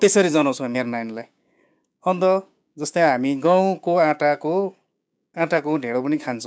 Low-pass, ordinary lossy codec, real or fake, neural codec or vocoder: none; none; fake; codec, 16 kHz, 6 kbps, DAC